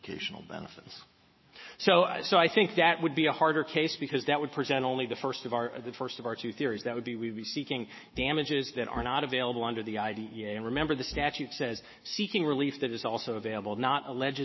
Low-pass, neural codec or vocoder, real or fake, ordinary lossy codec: 7.2 kHz; autoencoder, 48 kHz, 128 numbers a frame, DAC-VAE, trained on Japanese speech; fake; MP3, 24 kbps